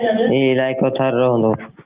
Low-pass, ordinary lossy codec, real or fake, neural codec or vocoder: 3.6 kHz; Opus, 32 kbps; real; none